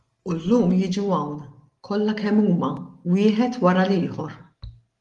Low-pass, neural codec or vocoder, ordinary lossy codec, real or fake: 10.8 kHz; none; Opus, 24 kbps; real